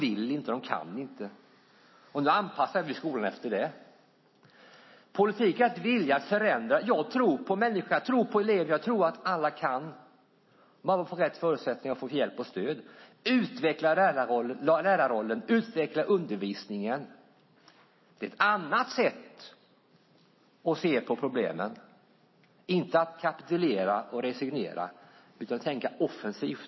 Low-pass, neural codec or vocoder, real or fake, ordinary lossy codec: 7.2 kHz; none; real; MP3, 24 kbps